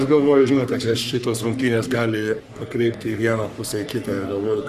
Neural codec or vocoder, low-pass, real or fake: codec, 44.1 kHz, 3.4 kbps, Pupu-Codec; 14.4 kHz; fake